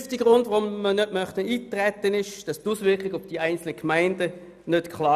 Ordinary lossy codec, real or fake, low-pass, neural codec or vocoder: none; real; 14.4 kHz; none